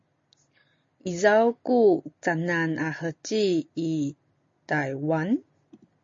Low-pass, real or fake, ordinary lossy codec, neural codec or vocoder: 7.2 kHz; real; MP3, 32 kbps; none